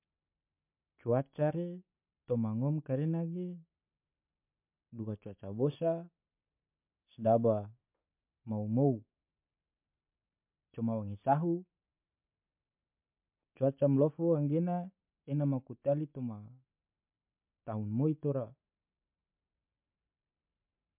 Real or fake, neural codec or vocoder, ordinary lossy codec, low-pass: real; none; MP3, 32 kbps; 3.6 kHz